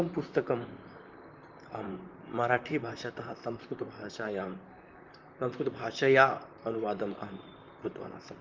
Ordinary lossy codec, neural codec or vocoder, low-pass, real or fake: Opus, 24 kbps; vocoder, 44.1 kHz, 128 mel bands, Pupu-Vocoder; 7.2 kHz; fake